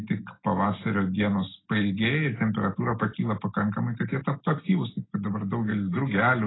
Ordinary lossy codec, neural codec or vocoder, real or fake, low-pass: AAC, 16 kbps; none; real; 7.2 kHz